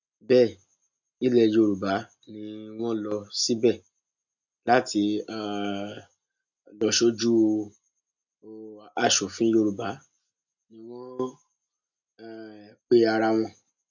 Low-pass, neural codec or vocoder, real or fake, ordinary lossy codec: 7.2 kHz; none; real; none